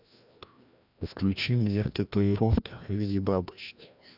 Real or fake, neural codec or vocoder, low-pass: fake; codec, 16 kHz, 1 kbps, FreqCodec, larger model; 5.4 kHz